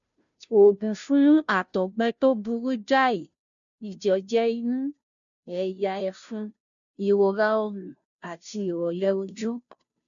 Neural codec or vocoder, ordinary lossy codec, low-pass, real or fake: codec, 16 kHz, 0.5 kbps, FunCodec, trained on Chinese and English, 25 frames a second; none; 7.2 kHz; fake